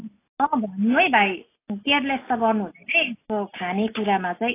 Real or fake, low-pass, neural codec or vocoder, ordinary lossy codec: real; 3.6 kHz; none; AAC, 24 kbps